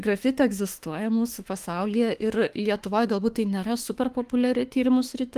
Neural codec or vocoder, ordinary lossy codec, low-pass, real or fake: autoencoder, 48 kHz, 32 numbers a frame, DAC-VAE, trained on Japanese speech; Opus, 24 kbps; 14.4 kHz; fake